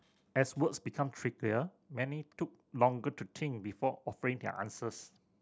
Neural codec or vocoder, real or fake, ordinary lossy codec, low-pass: none; real; none; none